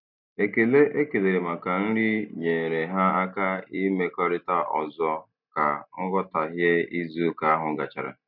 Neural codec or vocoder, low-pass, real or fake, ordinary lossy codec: none; 5.4 kHz; real; none